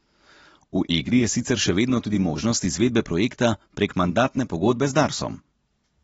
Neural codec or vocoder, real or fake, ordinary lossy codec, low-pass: none; real; AAC, 24 kbps; 19.8 kHz